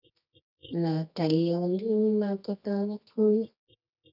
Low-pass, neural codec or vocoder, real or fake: 5.4 kHz; codec, 24 kHz, 0.9 kbps, WavTokenizer, medium music audio release; fake